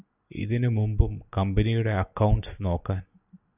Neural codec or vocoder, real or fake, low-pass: none; real; 3.6 kHz